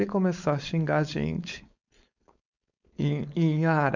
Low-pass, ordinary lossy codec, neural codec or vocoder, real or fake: 7.2 kHz; none; codec, 16 kHz, 4.8 kbps, FACodec; fake